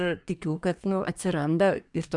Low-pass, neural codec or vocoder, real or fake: 10.8 kHz; codec, 24 kHz, 1 kbps, SNAC; fake